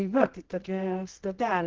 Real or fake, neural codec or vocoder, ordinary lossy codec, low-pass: fake; codec, 24 kHz, 0.9 kbps, WavTokenizer, medium music audio release; Opus, 16 kbps; 7.2 kHz